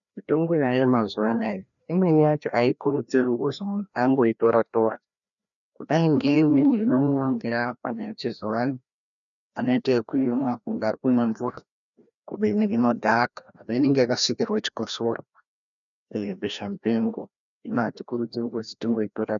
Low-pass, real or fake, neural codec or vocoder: 7.2 kHz; fake; codec, 16 kHz, 1 kbps, FreqCodec, larger model